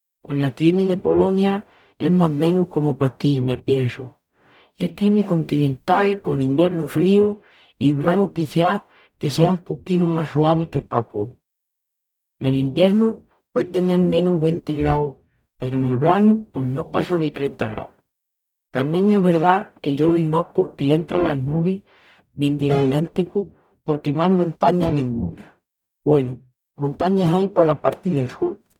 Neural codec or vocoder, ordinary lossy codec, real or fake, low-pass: codec, 44.1 kHz, 0.9 kbps, DAC; none; fake; 19.8 kHz